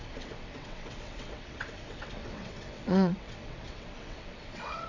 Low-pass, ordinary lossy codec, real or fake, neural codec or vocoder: 7.2 kHz; none; fake; vocoder, 22.05 kHz, 80 mel bands, WaveNeXt